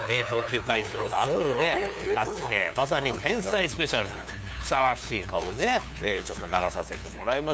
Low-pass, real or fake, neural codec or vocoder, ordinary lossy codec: none; fake; codec, 16 kHz, 2 kbps, FunCodec, trained on LibriTTS, 25 frames a second; none